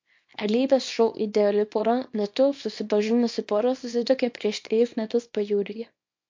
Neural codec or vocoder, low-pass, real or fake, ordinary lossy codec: codec, 24 kHz, 0.9 kbps, WavTokenizer, small release; 7.2 kHz; fake; MP3, 48 kbps